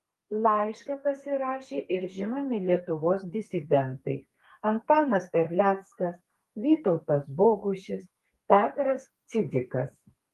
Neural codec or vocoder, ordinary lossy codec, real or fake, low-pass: codec, 32 kHz, 1.9 kbps, SNAC; Opus, 32 kbps; fake; 14.4 kHz